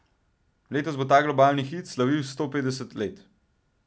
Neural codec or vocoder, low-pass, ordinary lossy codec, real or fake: none; none; none; real